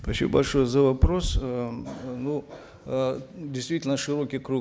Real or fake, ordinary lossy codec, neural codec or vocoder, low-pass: real; none; none; none